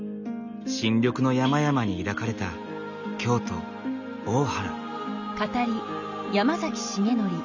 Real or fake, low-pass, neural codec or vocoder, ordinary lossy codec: real; 7.2 kHz; none; none